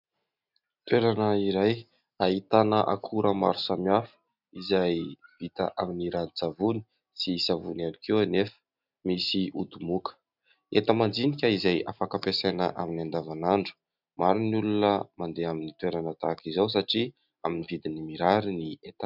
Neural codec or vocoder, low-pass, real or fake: none; 5.4 kHz; real